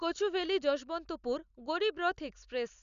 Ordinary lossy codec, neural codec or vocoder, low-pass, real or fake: none; none; 7.2 kHz; real